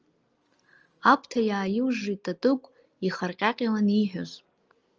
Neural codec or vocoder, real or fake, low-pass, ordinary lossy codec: none; real; 7.2 kHz; Opus, 24 kbps